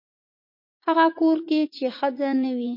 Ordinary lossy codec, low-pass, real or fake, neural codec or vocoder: MP3, 32 kbps; 5.4 kHz; fake; vocoder, 44.1 kHz, 80 mel bands, Vocos